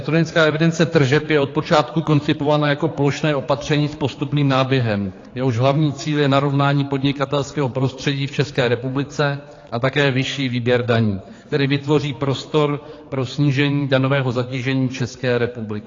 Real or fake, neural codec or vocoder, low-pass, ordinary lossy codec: fake; codec, 16 kHz, 4 kbps, X-Codec, HuBERT features, trained on general audio; 7.2 kHz; AAC, 32 kbps